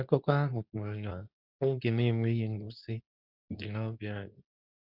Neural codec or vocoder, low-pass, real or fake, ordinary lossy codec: codec, 24 kHz, 0.9 kbps, WavTokenizer, medium speech release version 2; 5.4 kHz; fake; none